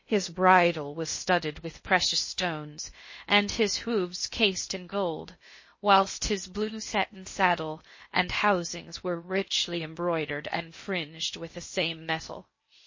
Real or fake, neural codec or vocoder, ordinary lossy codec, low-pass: fake; codec, 16 kHz in and 24 kHz out, 0.6 kbps, FocalCodec, streaming, 2048 codes; MP3, 32 kbps; 7.2 kHz